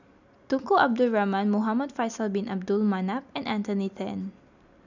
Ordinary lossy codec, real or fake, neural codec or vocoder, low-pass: none; real; none; 7.2 kHz